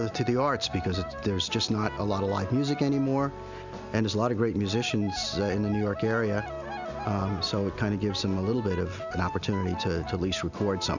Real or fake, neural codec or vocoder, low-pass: real; none; 7.2 kHz